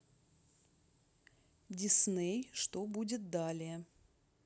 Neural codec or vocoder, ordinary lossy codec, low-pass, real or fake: none; none; none; real